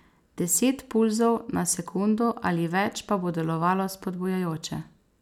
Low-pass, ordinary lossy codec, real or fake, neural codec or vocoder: 19.8 kHz; none; real; none